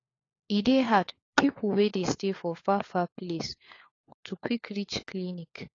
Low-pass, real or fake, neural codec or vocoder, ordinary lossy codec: 7.2 kHz; fake; codec, 16 kHz, 4 kbps, FunCodec, trained on LibriTTS, 50 frames a second; AAC, 32 kbps